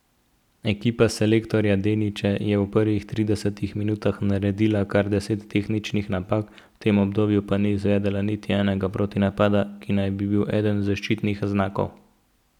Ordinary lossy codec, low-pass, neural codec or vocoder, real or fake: none; 19.8 kHz; none; real